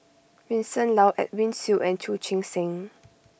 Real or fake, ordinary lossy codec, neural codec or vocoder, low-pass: real; none; none; none